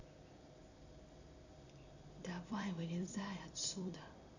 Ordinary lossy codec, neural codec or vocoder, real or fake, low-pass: none; vocoder, 44.1 kHz, 128 mel bands every 256 samples, BigVGAN v2; fake; 7.2 kHz